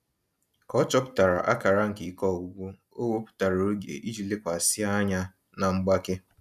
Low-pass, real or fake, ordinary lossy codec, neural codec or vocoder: 14.4 kHz; real; none; none